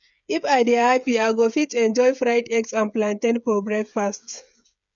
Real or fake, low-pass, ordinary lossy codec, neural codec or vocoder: fake; 7.2 kHz; none; codec, 16 kHz, 8 kbps, FreqCodec, smaller model